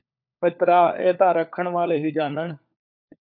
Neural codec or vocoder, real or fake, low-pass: codec, 16 kHz, 4 kbps, FunCodec, trained on LibriTTS, 50 frames a second; fake; 5.4 kHz